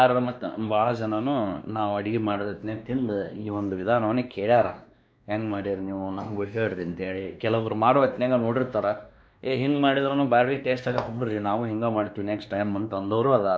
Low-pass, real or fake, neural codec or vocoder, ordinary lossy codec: none; fake; codec, 16 kHz, 2 kbps, X-Codec, WavLM features, trained on Multilingual LibriSpeech; none